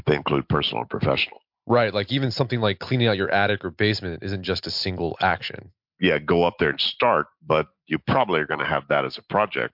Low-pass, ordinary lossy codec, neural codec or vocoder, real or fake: 5.4 kHz; MP3, 48 kbps; none; real